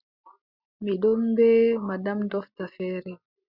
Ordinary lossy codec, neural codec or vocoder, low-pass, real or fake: Opus, 64 kbps; none; 5.4 kHz; real